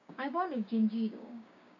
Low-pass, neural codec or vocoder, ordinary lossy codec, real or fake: 7.2 kHz; codec, 16 kHz, 6 kbps, DAC; none; fake